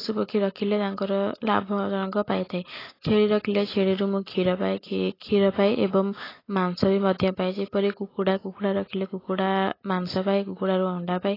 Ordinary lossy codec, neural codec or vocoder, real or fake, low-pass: AAC, 24 kbps; none; real; 5.4 kHz